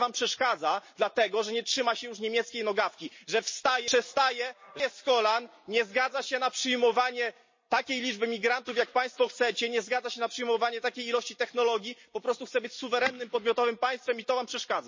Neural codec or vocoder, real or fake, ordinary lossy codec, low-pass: none; real; MP3, 32 kbps; 7.2 kHz